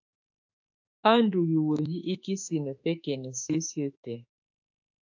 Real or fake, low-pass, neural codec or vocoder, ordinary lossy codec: fake; 7.2 kHz; autoencoder, 48 kHz, 32 numbers a frame, DAC-VAE, trained on Japanese speech; AAC, 48 kbps